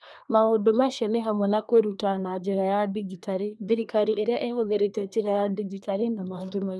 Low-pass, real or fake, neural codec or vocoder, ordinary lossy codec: none; fake; codec, 24 kHz, 1 kbps, SNAC; none